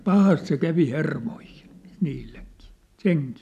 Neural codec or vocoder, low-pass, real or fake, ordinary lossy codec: none; 14.4 kHz; real; none